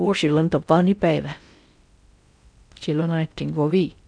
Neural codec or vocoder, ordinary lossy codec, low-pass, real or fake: codec, 16 kHz in and 24 kHz out, 0.6 kbps, FocalCodec, streaming, 4096 codes; none; 9.9 kHz; fake